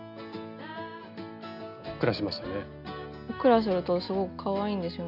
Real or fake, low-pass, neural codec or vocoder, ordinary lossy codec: real; 5.4 kHz; none; none